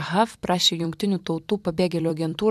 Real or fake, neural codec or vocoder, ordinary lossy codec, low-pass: fake; vocoder, 44.1 kHz, 128 mel bands every 256 samples, BigVGAN v2; AAC, 96 kbps; 14.4 kHz